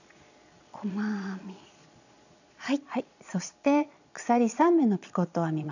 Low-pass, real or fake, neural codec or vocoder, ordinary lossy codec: 7.2 kHz; fake; vocoder, 22.05 kHz, 80 mel bands, Vocos; none